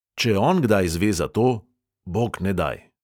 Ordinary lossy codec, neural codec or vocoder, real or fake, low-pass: none; none; real; 19.8 kHz